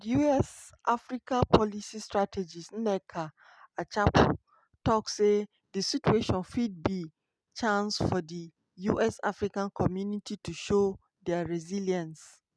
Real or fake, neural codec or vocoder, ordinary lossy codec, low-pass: real; none; none; none